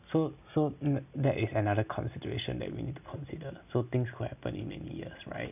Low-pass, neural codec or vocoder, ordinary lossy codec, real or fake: 3.6 kHz; none; none; real